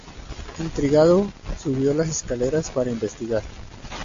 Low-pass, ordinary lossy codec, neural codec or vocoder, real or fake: 7.2 kHz; AAC, 48 kbps; none; real